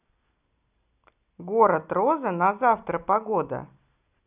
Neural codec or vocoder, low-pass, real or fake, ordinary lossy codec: none; 3.6 kHz; real; none